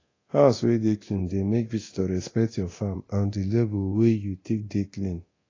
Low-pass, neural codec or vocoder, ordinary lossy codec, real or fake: 7.2 kHz; codec, 24 kHz, 0.9 kbps, DualCodec; AAC, 32 kbps; fake